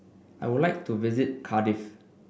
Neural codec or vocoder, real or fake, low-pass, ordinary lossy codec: none; real; none; none